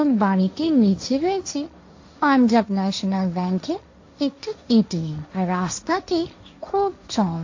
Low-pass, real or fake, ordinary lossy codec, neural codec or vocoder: 7.2 kHz; fake; AAC, 48 kbps; codec, 16 kHz, 1.1 kbps, Voila-Tokenizer